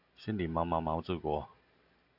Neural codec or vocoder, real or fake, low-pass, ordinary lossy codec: none; real; 5.4 kHz; AAC, 48 kbps